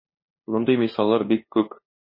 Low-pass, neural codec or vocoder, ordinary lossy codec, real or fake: 5.4 kHz; codec, 16 kHz, 2 kbps, FunCodec, trained on LibriTTS, 25 frames a second; MP3, 24 kbps; fake